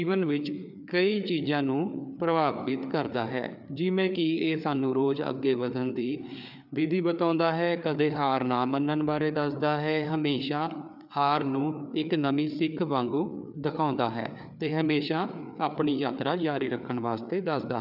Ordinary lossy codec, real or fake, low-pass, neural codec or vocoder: none; fake; 5.4 kHz; codec, 16 kHz, 4 kbps, FreqCodec, larger model